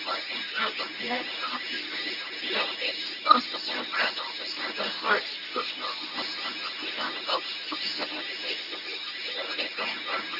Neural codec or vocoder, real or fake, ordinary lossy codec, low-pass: codec, 24 kHz, 0.9 kbps, WavTokenizer, medium speech release version 1; fake; none; 5.4 kHz